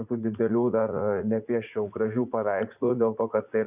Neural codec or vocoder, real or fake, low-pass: vocoder, 44.1 kHz, 80 mel bands, Vocos; fake; 3.6 kHz